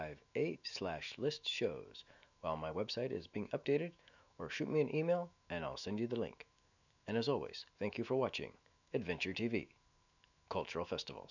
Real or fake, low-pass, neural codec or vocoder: fake; 7.2 kHz; autoencoder, 48 kHz, 128 numbers a frame, DAC-VAE, trained on Japanese speech